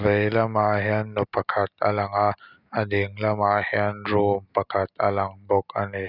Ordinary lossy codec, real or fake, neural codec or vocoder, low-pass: none; real; none; 5.4 kHz